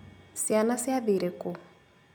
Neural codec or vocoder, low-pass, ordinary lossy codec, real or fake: none; none; none; real